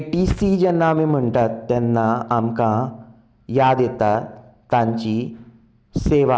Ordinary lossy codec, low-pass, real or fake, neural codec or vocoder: none; none; real; none